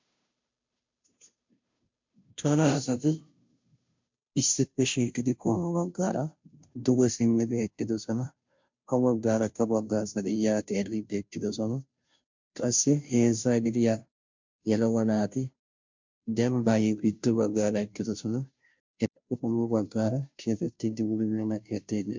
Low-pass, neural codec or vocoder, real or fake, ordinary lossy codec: 7.2 kHz; codec, 16 kHz, 0.5 kbps, FunCodec, trained on Chinese and English, 25 frames a second; fake; MP3, 64 kbps